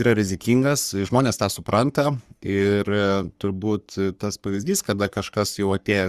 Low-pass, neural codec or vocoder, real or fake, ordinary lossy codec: 14.4 kHz; codec, 44.1 kHz, 3.4 kbps, Pupu-Codec; fake; Opus, 64 kbps